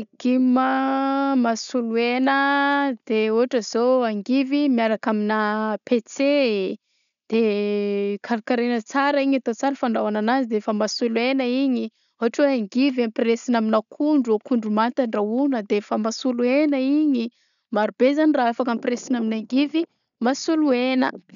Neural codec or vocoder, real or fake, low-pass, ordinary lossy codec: none; real; 7.2 kHz; none